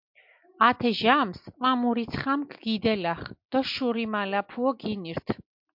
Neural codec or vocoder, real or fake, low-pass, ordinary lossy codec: none; real; 5.4 kHz; MP3, 48 kbps